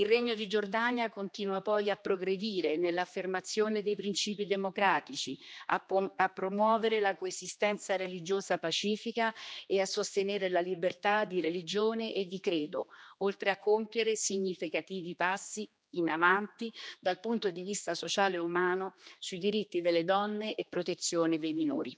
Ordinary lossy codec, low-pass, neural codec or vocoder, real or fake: none; none; codec, 16 kHz, 2 kbps, X-Codec, HuBERT features, trained on general audio; fake